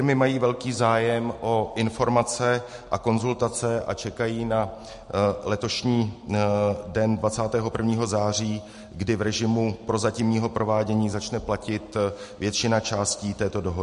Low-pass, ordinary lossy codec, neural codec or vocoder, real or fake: 14.4 kHz; MP3, 48 kbps; none; real